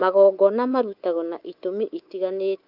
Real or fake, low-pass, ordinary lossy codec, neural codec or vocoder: fake; 5.4 kHz; Opus, 24 kbps; codec, 24 kHz, 3.1 kbps, DualCodec